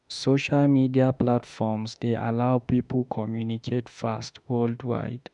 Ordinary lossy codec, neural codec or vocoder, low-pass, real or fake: none; autoencoder, 48 kHz, 32 numbers a frame, DAC-VAE, trained on Japanese speech; 10.8 kHz; fake